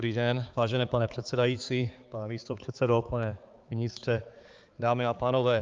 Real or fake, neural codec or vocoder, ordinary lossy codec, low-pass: fake; codec, 16 kHz, 4 kbps, X-Codec, HuBERT features, trained on balanced general audio; Opus, 32 kbps; 7.2 kHz